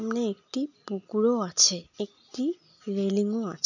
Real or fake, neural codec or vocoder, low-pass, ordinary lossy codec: real; none; 7.2 kHz; none